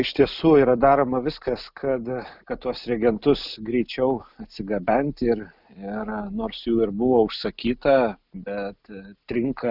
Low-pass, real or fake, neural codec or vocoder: 5.4 kHz; real; none